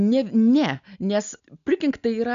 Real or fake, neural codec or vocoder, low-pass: real; none; 7.2 kHz